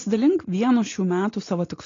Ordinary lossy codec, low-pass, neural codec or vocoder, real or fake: AAC, 32 kbps; 7.2 kHz; none; real